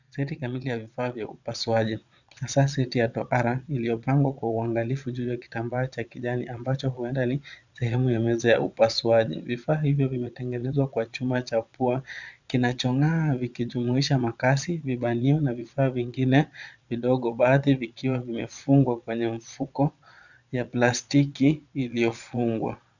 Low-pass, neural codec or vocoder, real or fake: 7.2 kHz; vocoder, 22.05 kHz, 80 mel bands, Vocos; fake